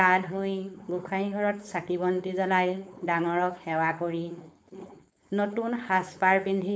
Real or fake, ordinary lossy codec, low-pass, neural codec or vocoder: fake; none; none; codec, 16 kHz, 4.8 kbps, FACodec